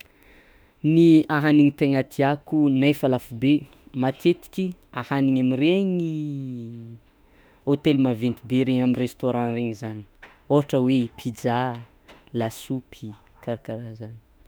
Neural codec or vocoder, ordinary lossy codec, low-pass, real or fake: autoencoder, 48 kHz, 32 numbers a frame, DAC-VAE, trained on Japanese speech; none; none; fake